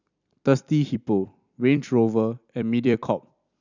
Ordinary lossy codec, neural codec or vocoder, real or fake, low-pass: none; vocoder, 44.1 kHz, 128 mel bands every 256 samples, BigVGAN v2; fake; 7.2 kHz